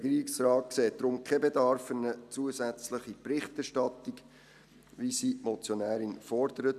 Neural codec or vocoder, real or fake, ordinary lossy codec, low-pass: none; real; none; 14.4 kHz